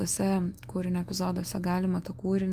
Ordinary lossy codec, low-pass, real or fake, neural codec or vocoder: Opus, 32 kbps; 14.4 kHz; fake; autoencoder, 48 kHz, 128 numbers a frame, DAC-VAE, trained on Japanese speech